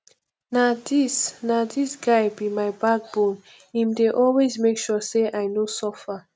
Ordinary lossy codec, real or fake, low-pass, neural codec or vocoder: none; real; none; none